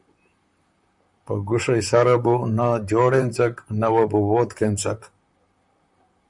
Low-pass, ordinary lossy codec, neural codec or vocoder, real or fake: 10.8 kHz; Opus, 64 kbps; vocoder, 44.1 kHz, 128 mel bands, Pupu-Vocoder; fake